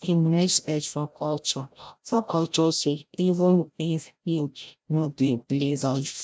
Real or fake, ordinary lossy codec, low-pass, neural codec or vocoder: fake; none; none; codec, 16 kHz, 0.5 kbps, FreqCodec, larger model